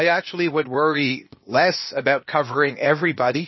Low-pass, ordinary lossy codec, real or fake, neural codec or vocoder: 7.2 kHz; MP3, 24 kbps; fake; codec, 16 kHz, 0.8 kbps, ZipCodec